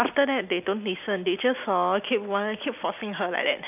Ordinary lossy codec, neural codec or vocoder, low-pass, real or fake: none; none; 3.6 kHz; real